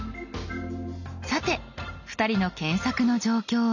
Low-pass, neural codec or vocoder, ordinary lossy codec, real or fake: 7.2 kHz; none; none; real